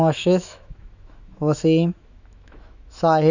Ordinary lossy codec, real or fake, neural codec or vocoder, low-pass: none; fake; autoencoder, 48 kHz, 128 numbers a frame, DAC-VAE, trained on Japanese speech; 7.2 kHz